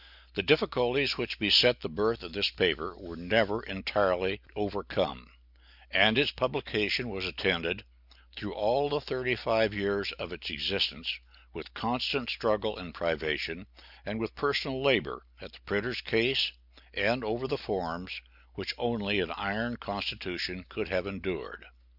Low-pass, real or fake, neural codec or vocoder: 5.4 kHz; real; none